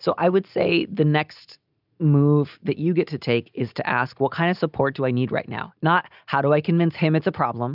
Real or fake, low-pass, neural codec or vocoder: real; 5.4 kHz; none